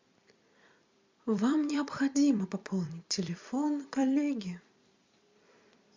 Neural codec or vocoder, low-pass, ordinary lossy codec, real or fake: vocoder, 44.1 kHz, 128 mel bands every 256 samples, BigVGAN v2; 7.2 kHz; MP3, 64 kbps; fake